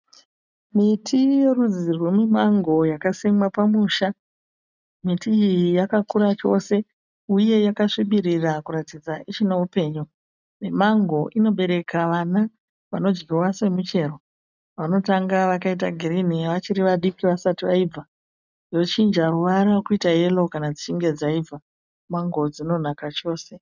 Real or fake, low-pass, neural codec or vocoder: real; 7.2 kHz; none